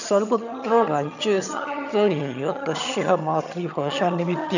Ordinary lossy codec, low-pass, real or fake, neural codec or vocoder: none; 7.2 kHz; fake; vocoder, 22.05 kHz, 80 mel bands, HiFi-GAN